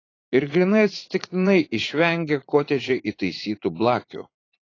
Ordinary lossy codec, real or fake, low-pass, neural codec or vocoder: AAC, 32 kbps; fake; 7.2 kHz; vocoder, 44.1 kHz, 80 mel bands, Vocos